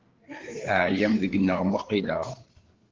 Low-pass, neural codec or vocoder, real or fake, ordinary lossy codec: 7.2 kHz; codec, 16 kHz, 4 kbps, FreqCodec, larger model; fake; Opus, 16 kbps